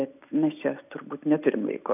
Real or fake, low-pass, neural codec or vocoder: real; 3.6 kHz; none